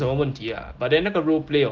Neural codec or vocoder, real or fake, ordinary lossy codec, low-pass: none; real; Opus, 16 kbps; 7.2 kHz